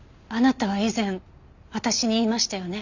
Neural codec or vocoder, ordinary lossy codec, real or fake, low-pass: none; none; real; 7.2 kHz